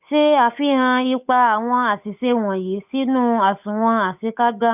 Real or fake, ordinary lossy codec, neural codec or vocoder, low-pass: fake; none; codec, 16 kHz, 8 kbps, FunCodec, trained on Chinese and English, 25 frames a second; 3.6 kHz